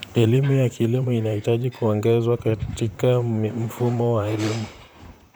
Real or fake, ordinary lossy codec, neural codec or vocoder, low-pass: fake; none; vocoder, 44.1 kHz, 128 mel bands, Pupu-Vocoder; none